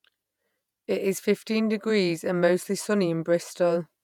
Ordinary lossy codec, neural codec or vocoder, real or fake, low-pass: none; vocoder, 48 kHz, 128 mel bands, Vocos; fake; 19.8 kHz